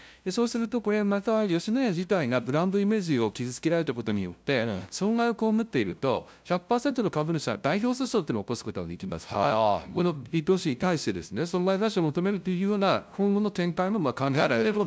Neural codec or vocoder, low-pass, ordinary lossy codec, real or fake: codec, 16 kHz, 0.5 kbps, FunCodec, trained on LibriTTS, 25 frames a second; none; none; fake